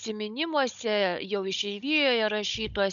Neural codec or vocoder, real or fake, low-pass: codec, 16 kHz, 16 kbps, FunCodec, trained on Chinese and English, 50 frames a second; fake; 7.2 kHz